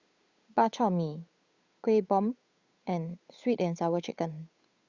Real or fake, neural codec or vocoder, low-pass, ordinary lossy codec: fake; codec, 16 kHz, 8 kbps, FunCodec, trained on Chinese and English, 25 frames a second; 7.2 kHz; Opus, 64 kbps